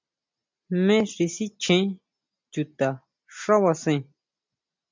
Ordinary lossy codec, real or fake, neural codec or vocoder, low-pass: MP3, 64 kbps; real; none; 7.2 kHz